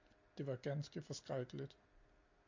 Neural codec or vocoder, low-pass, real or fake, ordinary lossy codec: none; 7.2 kHz; real; MP3, 48 kbps